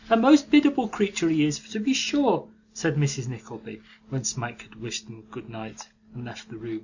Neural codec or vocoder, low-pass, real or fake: none; 7.2 kHz; real